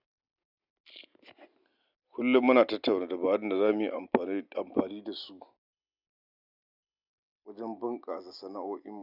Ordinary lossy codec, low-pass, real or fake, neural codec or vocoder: none; 5.4 kHz; real; none